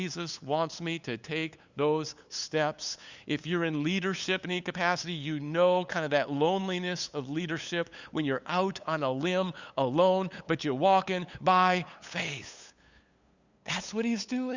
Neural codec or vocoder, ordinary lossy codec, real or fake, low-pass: codec, 16 kHz, 8 kbps, FunCodec, trained on LibriTTS, 25 frames a second; Opus, 64 kbps; fake; 7.2 kHz